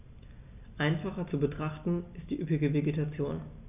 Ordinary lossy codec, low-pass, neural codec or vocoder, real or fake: none; 3.6 kHz; none; real